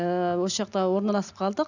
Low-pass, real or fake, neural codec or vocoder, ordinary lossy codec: 7.2 kHz; real; none; none